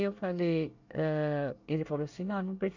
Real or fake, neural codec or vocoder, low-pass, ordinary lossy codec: fake; codec, 24 kHz, 1 kbps, SNAC; 7.2 kHz; none